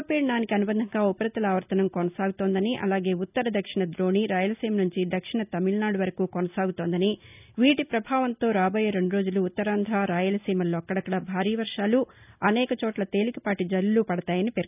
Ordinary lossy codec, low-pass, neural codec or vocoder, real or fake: none; 3.6 kHz; none; real